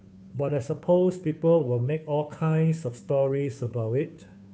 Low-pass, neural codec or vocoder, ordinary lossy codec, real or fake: none; codec, 16 kHz, 2 kbps, FunCodec, trained on Chinese and English, 25 frames a second; none; fake